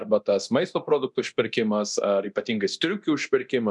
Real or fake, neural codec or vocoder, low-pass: fake; codec, 24 kHz, 0.9 kbps, DualCodec; 10.8 kHz